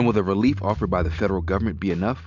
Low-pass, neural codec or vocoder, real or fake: 7.2 kHz; none; real